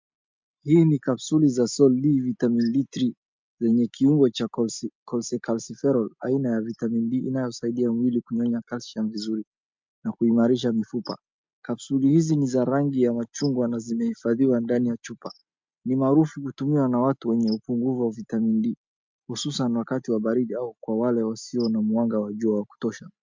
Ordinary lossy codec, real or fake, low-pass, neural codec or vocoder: MP3, 64 kbps; real; 7.2 kHz; none